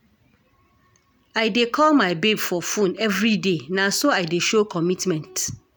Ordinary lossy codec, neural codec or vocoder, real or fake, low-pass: none; none; real; none